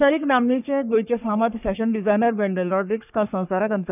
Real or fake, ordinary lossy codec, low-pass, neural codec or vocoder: fake; none; 3.6 kHz; codec, 44.1 kHz, 3.4 kbps, Pupu-Codec